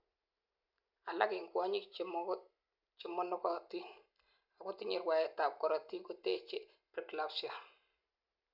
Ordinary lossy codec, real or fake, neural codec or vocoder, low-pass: none; real; none; 5.4 kHz